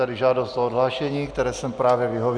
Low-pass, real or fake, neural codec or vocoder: 9.9 kHz; real; none